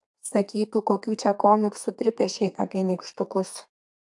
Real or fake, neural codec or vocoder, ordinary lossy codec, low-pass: fake; codec, 32 kHz, 1.9 kbps, SNAC; AAC, 64 kbps; 10.8 kHz